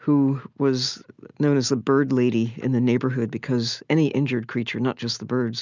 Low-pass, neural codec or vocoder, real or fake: 7.2 kHz; none; real